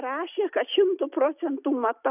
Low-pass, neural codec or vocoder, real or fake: 3.6 kHz; none; real